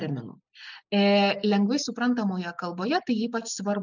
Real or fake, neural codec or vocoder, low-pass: real; none; 7.2 kHz